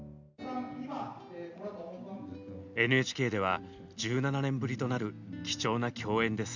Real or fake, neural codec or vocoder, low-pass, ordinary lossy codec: real; none; 7.2 kHz; none